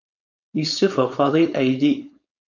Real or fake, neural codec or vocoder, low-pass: fake; codec, 16 kHz, 4.8 kbps, FACodec; 7.2 kHz